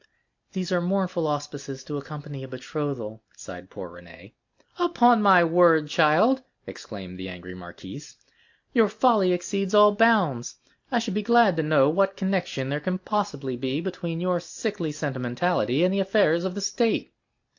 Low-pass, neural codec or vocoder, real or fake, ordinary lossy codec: 7.2 kHz; none; real; AAC, 48 kbps